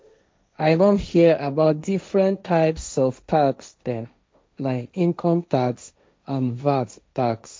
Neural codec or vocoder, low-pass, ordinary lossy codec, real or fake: codec, 16 kHz, 1.1 kbps, Voila-Tokenizer; none; none; fake